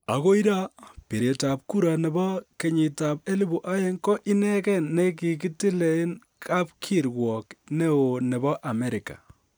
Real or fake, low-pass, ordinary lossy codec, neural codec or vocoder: real; none; none; none